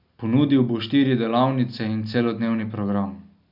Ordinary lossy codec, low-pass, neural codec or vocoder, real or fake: none; 5.4 kHz; none; real